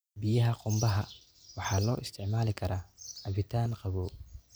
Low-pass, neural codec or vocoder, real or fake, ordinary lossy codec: none; none; real; none